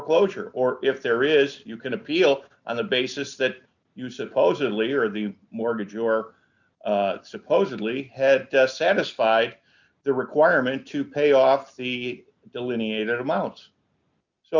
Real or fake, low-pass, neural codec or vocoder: real; 7.2 kHz; none